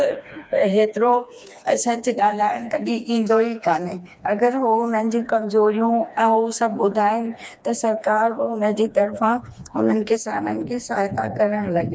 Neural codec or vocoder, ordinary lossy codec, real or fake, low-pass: codec, 16 kHz, 2 kbps, FreqCodec, smaller model; none; fake; none